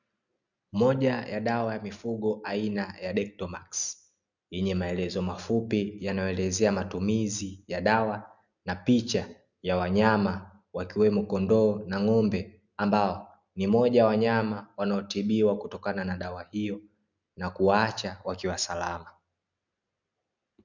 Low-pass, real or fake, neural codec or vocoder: 7.2 kHz; real; none